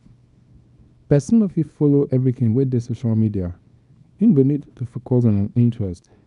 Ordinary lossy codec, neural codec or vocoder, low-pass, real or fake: none; codec, 24 kHz, 0.9 kbps, WavTokenizer, small release; 10.8 kHz; fake